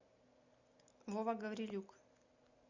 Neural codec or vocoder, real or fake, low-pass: none; real; 7.2 kHz